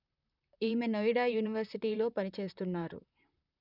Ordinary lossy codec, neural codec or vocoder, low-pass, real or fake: none; vocoder, 44.1 kHz, 128 mel bands, Pupu-Vocoder; 5.4 kHz; fake